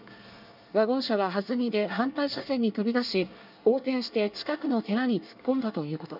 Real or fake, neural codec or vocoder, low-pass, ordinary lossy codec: fake; codec, 24 kHz, 1 kbps, SNAC; 5.4 kHz; none